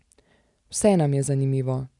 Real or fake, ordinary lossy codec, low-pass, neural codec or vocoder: real; none; 10.8 kHz; none